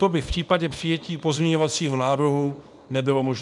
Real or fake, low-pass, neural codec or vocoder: fake; 10.8 kHz; codec, 24 kHz, 0.9 kbps, WavTokenizer, small release